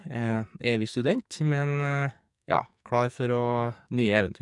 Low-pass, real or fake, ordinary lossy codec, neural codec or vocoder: 10.8 kHz; fake; none; codec, 44.1 kHz, 2.6 kbps, SNAC